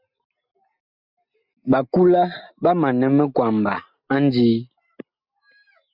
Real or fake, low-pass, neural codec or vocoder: real; 5.4 kHz; none